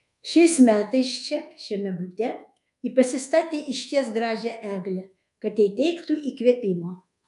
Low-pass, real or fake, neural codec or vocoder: 10.8 kHz; fake; codec, 24 kHz, 1.2 kbps, DualCodec